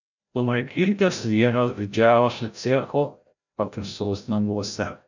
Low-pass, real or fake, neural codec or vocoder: 7.2 kHz; fake; codec, 16 kHz, 0.5 kbps, FreqCodec, larger model